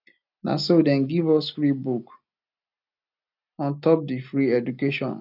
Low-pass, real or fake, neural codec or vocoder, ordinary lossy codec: 5.4 kHz; real; none; none